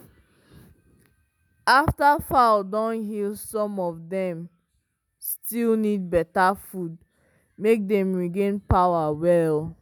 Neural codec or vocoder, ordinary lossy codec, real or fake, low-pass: none; none; real; none